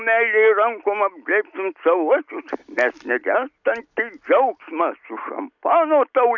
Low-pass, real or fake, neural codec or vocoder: 7.2 kHz; real; none